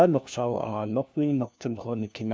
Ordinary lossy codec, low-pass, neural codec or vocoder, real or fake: none; none; codec, 16 kHz, 1 kbps, FunCodec, trained on LibriTTS, 50 frames a second; fake